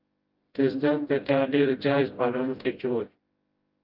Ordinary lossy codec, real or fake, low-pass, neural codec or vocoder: Opus, 32 kbps; fake; 5.4 kHz; codec, 16 kHz, 0.5 kbps, FreqCodec, smaller model